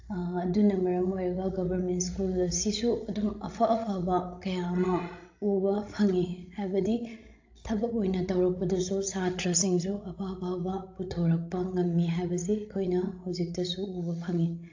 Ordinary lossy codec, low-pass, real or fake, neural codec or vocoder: AAC, 48 kbps; 7.2 kHz; fake; codec, 16 kHz, 16 kbps, FreqCodec, larger model